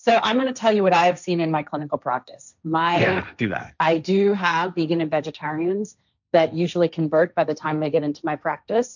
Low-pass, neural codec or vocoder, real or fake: 7.2 kHz; codec, 16 kHz, 1.1 kbps, Voila-Tokenizer; fake